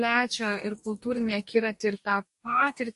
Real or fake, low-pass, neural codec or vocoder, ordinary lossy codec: fake; 14.4 kHz; codec, 44.1 kHz, 2.6 kbps, DAC; MP3, 48 kbps